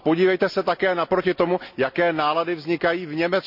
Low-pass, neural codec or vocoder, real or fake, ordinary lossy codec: 5.4 kHz; none; real; none